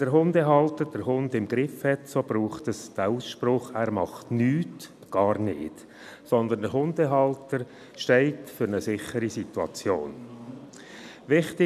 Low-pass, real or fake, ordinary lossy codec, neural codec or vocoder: 14.4 kHz; real; none; none